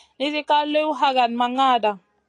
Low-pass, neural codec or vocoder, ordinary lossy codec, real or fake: 9.9 kHz; none; AAC, 48 kbps; real